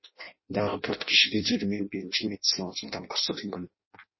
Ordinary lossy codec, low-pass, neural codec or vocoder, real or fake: MP3, 24 kbps; 7.2 kHz; codec, 16 kHz in and 24 kHz out, 0.6 kbps, FireRedTTS-2 codec; fake